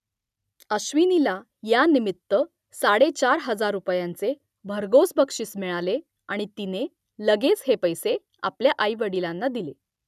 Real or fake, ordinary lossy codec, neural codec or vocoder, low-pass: real; none; none; 14.4 kHz